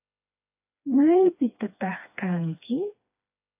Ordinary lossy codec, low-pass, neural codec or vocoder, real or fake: AAC, 32 kbps; 3.6 kHz; codec, 16 kHz, 2 kbps, FreqCodec, smaller model; fake